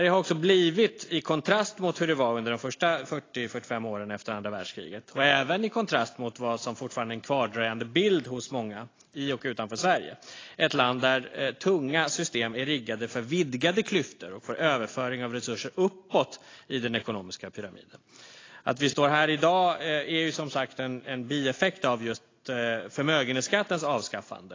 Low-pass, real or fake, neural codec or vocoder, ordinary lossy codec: 7.2 kHz; real; none; AAC, 32 kbps